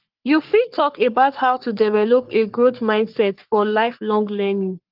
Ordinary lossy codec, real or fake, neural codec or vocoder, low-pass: Opus, 32 kbps; fake; codec, 44.1 kHz, 3.4 kbps, Pupu-Codec; 5.4 kHz